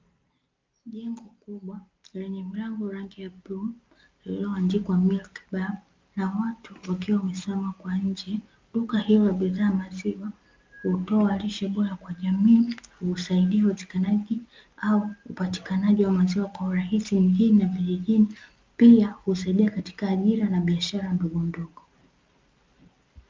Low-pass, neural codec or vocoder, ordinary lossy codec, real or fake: 7.2 kHz; none; Opus, 24 kbps; real